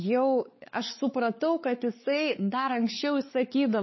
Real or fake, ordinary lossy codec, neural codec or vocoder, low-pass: fake; MP3, 24 kbps; codec, 16 kHz, 4 kbps, X-Codec, WavLM features, trained on Multilingual LibriSpeech; 7.2 kHz